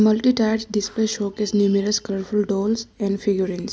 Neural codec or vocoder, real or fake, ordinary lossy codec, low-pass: none; real; none; none